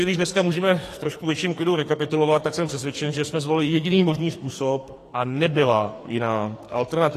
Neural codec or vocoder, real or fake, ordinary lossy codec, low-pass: codec, 44.1 kHz, 2.6 kbps, SNAC; fake; AAC, 48 kbps; 14.4 kHz